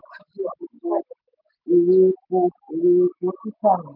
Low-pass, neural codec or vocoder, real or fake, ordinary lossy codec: 5.4 kHz; none; real; none